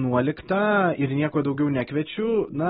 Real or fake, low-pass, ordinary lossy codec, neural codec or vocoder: real; 7.2 kHz; AAC, 16 kbps; none